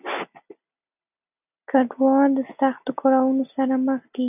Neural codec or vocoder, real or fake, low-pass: none; real; 3.6 kHz